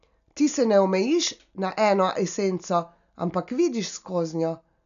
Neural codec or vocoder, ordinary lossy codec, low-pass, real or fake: none; none; 7.2 kHz; real